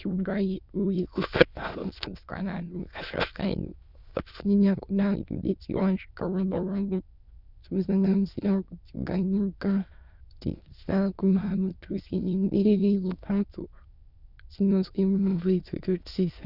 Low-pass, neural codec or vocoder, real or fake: 5.4 kHz; autoencoder, 22.05 kHz, a latent of 192 numbers a frame, VITS, trained on many speakers; fake